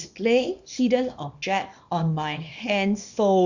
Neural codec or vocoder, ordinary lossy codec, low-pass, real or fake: codec, 24 kHz, 0.9 kbps, WavTokenizer, small release; none; 7.2 kHz; fake